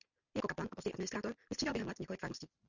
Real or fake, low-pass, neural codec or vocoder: real; 7.2 kHz; none